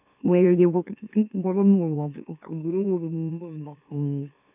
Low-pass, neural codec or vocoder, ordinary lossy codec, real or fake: 3.6 kHz; autoencoder, 44.1 kHz, a latent of 192 numbers a frame, MeloTTS; none; fake